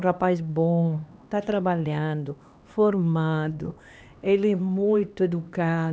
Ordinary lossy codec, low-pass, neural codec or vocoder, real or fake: none; none; codec, 16 kHz, 2 kbps, X-Codec, HuBERT features, trained on LibriSpeech; fake